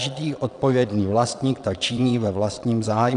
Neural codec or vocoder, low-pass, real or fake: vocoder, 22.05 kHz, 80 mel bands, Vocos; 9.9 kHz; fake